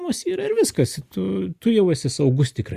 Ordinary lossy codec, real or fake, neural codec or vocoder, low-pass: Opus, 64 kbps; real; none; 14.4 kHz